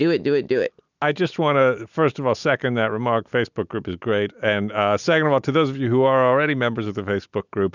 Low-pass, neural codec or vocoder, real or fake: 7.2 kHz; none; real